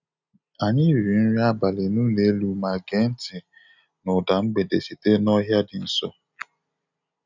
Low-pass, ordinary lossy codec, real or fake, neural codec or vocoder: 7.2 kHz; none; real; none